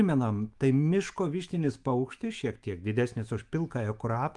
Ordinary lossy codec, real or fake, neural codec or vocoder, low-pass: Opus, 32 kbps; fake; autoencoder, 48 kHz, 128 numbers a frame, DAC-VAE, trained on Japanese speech; 10.8 kHz